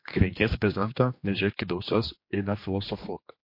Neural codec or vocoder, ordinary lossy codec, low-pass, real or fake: codec, 16 kHz, 2 kbps, X-Codec, HuBERT features, trained on general audio; MP3, 32 kbps; 5.4 kHz; fake